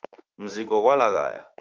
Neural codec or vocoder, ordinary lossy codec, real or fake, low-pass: autoencoder, 48 kHz, 32 numbers a frame, DAC-VAE, trained on Japanese speech; Opus, 24 kbps; fake; 7.2 kHz